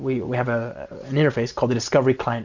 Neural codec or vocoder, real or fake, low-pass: none; real; 7.2 kHz